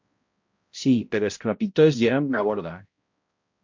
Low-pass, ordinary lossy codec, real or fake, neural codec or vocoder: 7.2 kHz; MP3, 48 kbps; fake; codec, 16 kHz, 0.5 kbps, X-Codec, HuBERT features, trained on balanced general audio